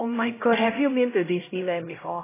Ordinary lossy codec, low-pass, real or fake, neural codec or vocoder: AAC, 16 kbps; 3.6 kHz; fake; codec, 16 kHz, 0.5 kbps, X-Codec, HuBERT features, trained on LibriSpeech